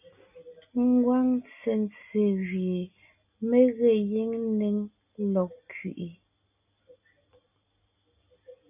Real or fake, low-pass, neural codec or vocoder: real; 3.6 kHz; none